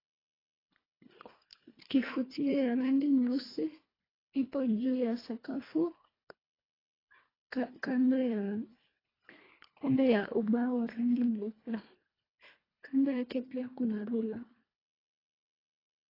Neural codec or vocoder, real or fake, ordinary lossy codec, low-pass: codec, 24 kHz, 1.5 kbps, HILCodec; fake; AAC, 24 kbps; 5.4 kHz